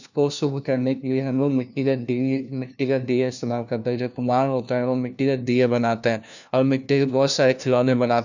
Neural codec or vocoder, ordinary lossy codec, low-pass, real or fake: codec, 16 kHz, 1 kbps, FunCodec, trained on LibriTTS, 50 frames a second; none; 7.2 kHz; fake